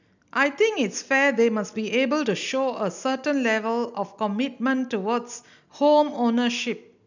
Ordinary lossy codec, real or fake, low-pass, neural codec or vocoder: none; real; 7.2 kHz; none